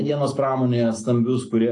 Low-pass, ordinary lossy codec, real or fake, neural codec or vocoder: 10.8 kHz; AAC, 48 kbps; real; none